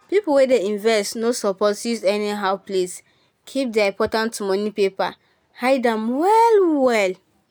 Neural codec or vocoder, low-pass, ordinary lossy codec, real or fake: none; none; none; real